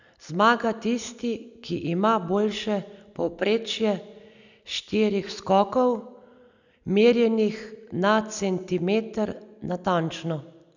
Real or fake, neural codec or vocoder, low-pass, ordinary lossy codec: real; none; 7.2 kHz; none